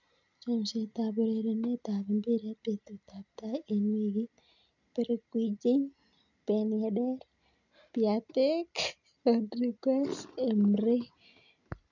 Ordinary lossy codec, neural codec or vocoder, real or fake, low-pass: none; none; real; 7.2 kHz